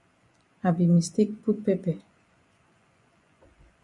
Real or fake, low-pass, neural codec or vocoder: fake; 10.8 kHz; vocoder, 24 kHz, 100 mel bands, Vocos